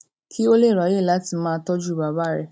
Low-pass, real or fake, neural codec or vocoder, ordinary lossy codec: none; real; none; none